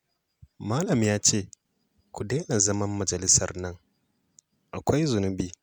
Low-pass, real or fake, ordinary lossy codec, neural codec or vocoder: none; real; none; none